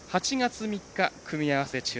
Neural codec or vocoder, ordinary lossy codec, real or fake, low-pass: none; none; real; none